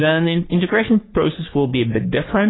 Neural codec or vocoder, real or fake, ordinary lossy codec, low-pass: codec, 44.1 kHz, 3.4 kbps, Pupu-Codec; fake; AAC, 16 kbps; 7.2 kHz